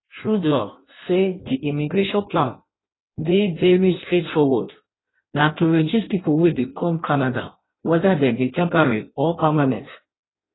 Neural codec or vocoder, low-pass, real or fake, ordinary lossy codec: codec, 16 kHz in and 24 kHz out, 0.6 kbps, FireRedTTS-2 codec; 7.2 kHz; fake; AAC, 16 kbps